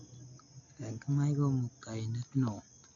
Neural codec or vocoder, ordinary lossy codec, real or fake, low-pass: none; AAC, 48 kbps; real; 7.2 kHz